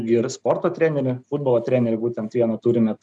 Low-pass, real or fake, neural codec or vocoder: 10.8 kHz; real; none